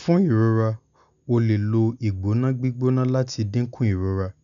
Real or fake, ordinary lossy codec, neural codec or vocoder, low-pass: real; none; none; 7.2 kHz